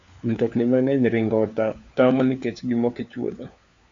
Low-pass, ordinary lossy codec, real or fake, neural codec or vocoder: 7.2 kHz; AAC, 64 kbps; fake; codec, 16 kHz, 4 kbps, FunCodec, trained on LibriTTS, 50 frames a second